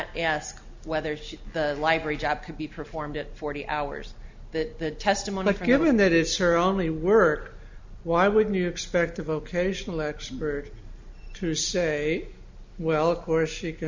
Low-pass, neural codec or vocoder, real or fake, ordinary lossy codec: 7.2 kHz; none; real; MP3, 64 kbps